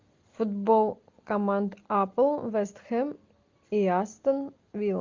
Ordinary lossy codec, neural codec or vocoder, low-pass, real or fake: Opus, 24 kbps; none; 7.2 kHz; real